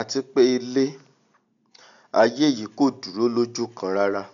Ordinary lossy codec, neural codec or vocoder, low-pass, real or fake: none; none; 7.2 kHz; real